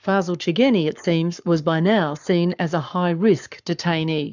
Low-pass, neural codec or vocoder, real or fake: 7.2 kHz; codec, 44.1 kHz, 7.8 kbps, Pupu-Codec; fake